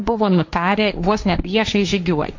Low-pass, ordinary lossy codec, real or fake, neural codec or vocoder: 7.2 kHz; MP3, 48 kbps; fake; codec, 16 kHz, 1.1 kbps, Voila-Tokenizer